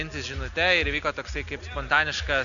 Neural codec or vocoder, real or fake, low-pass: none; real; 7.2 kHz